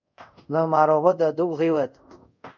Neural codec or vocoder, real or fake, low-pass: codec, 24 kHz, 0.5 kbps, DualCodec; fake; 7.2 kHz